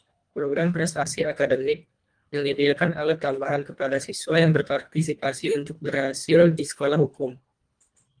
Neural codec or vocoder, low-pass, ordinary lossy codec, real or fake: codec, 24 kHz, 1.5 kbps, HILCodec; 9.9 kHz; Opus, 32 kbps; fake